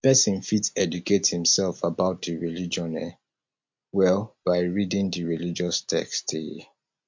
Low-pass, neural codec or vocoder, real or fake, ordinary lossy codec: 7.2 kHz; none; real; MP3, 48 kbps